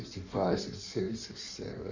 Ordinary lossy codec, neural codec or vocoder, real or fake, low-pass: none; codec, 16 kHz in and 24 kHz out, 1.1 kbps, FireRedTTS-2 codec; fake; 7.2 kHz